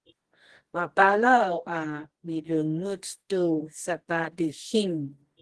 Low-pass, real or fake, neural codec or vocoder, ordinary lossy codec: 10.8 kHz; fake; codec, 24 kHz, 0.9 kbps, WavTokenizer, medium music audio release; Opus, 16 kbps